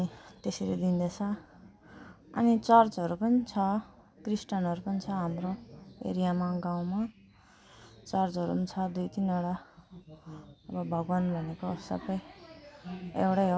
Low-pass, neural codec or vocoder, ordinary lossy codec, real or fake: none; none; none; real